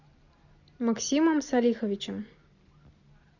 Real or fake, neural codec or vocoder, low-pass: real; none; 7.2 kHz